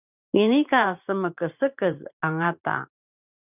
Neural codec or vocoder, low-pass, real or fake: vocoder, 44.1 kHz, 128 mel bands every 512 samples, BigVGAN v2; 3.6 kHz; fake